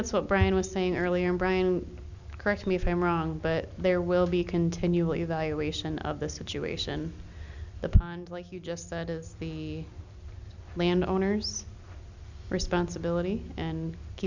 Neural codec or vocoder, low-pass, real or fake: none; 7.2 kHz; real